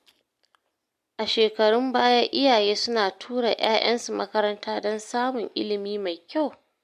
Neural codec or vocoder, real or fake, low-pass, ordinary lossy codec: none; real; 14.4 kHz; MP3, 64 kbps